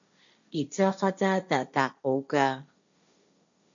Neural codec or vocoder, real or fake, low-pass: codec, 16 kHz, 1.1 kbps, Voila-Tokenizer; fake; 7.2 kHz